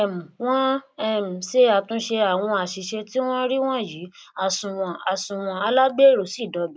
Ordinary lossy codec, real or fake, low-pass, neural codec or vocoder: none; real; none; none